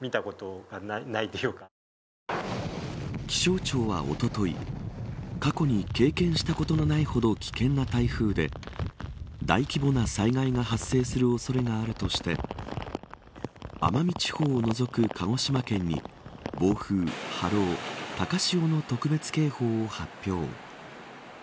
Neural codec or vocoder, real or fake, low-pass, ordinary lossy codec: none; real; none; none